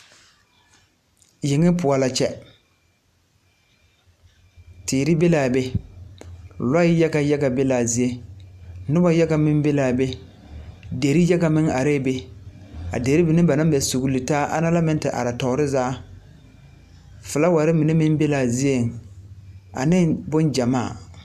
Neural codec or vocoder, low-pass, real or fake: none; 14.4 kHz; real